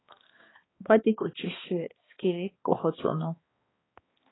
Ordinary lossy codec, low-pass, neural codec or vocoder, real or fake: AAC, 16 kbps; 7.2 kHz; codec, 16 kHz, 2 kbps, X-Codec, HuBERT features, trained on balanced general audio; fake